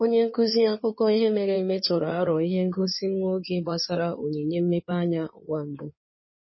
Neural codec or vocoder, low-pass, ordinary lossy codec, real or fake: codec, 16 kHz in and 24 kHz out, 2.2 kbps, FireRedTTS-2 codec; 7.2 kHz; MP3, 24 kbps; fake